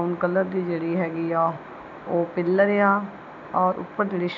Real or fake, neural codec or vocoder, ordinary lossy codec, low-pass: real; none; none; 7.2 kHz